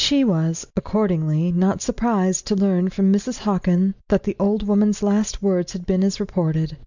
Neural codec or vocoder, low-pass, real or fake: none; 7.2 kHz; real